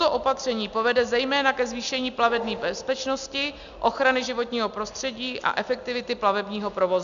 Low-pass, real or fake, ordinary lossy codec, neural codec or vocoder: 7.2 kHz; real; MP3, 96 kbps; none